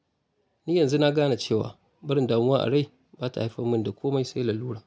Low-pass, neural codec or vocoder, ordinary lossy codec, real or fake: none; none; none; real